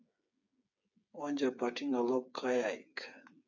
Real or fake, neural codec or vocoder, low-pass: fake; codec, 16 kHz, 8 kbps, FreqCodec, smaller model; 7.2 kHz